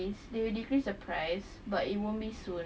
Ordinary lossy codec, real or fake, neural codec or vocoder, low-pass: none; real; none; none